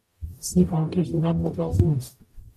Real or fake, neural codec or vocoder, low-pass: fake; codec, 44.1 kHz, 0.9 kbps, DAC; 14.4 kHz